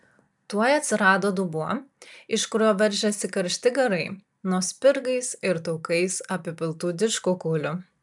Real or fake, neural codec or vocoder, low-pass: real; none; 10.8 kHz